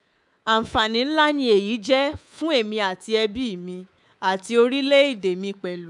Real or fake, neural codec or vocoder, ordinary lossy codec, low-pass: fake; codec, 24 kHz, 3.1 kbps, DualCodec; none; 10.8 kHz